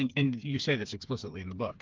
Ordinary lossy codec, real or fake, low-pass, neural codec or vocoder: Opus, 32 kbps; fake; 7.2 kHz; codec, 16 kHz, 4 kbps, FreqCodec, smaller model